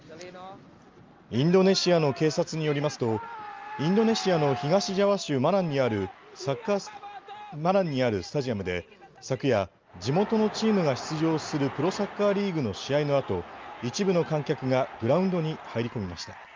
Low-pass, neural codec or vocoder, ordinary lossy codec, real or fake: 7.2 kHz; none; Opus, 24 kbps; real